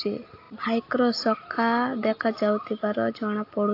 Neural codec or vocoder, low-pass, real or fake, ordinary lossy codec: none; 5.4 kHz; real; AAC, 32 kbps